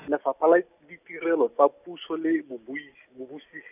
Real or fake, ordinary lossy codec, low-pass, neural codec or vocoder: real; none; 3.6 kHz; none